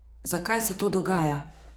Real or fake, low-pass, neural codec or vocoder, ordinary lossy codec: fake; none; codec, 44.1 kHz, 2.6 kbps, SNAC; none